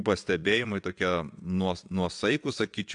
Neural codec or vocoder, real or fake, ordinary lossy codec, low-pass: vocoder, 22.05 kHz, 80 mel bands, WaveNeXt; fake; AAC, 64 kbps; 9.9 kHz